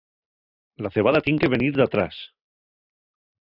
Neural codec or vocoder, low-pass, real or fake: none; 5.4 kHz; real